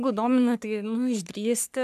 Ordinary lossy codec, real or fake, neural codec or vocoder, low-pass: MP3, 96 kbps; fake; codec, 44.1 kHz, 3.4 kbps, Pupu-Codec; 14.4 kHz